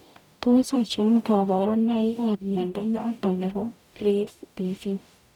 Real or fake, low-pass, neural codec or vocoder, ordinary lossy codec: fake; 19.8 kHz; codec, 44.1 kHz, 0.9 kbps, DAC; none